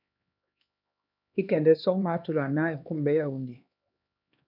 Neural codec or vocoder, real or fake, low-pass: codec, 16 kHz, 2 kbps, X-Codec, HuBERT features, trained on LibriSpeech; fake; 5.4 kHz